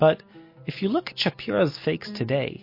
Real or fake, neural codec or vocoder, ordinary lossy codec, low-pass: real; none; MP3, 32 kbps; 5.4 kHz